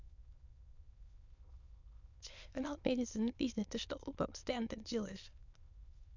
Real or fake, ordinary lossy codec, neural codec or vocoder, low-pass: fake; none; autoencoder, 22.05 kHz, a latent of 192 numbers a frame, VITS, trained on many speakers; 7.2 kHz